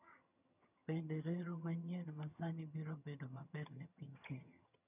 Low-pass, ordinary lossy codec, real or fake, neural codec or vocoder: 3.6 kHz; none; fake; vocoder, 22.05 kHz, 80 mel bands, HiFi-GAN